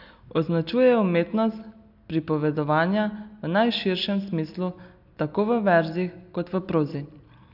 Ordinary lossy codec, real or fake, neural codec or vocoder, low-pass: none; real; none; 5.4 kHz